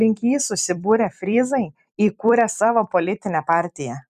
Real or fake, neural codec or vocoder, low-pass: fake; vocoder, 44.1 kHz, 128 mel bands every 256 samples, BigVGAN v2; 14.4 kHz